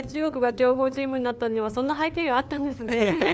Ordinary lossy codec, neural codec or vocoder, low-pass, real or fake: none; codec, 16 kHz, 2 kbps, FunCodec, trained on LibriTTS, 25 frames a second; none; fake